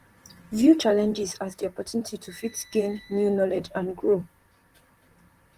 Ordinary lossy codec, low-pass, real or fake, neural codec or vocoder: Opus, 24 kbps; 14.4 kHz; fake; vocoder, 44.1 kHz, 128 mel bands, Pupu-Vocoder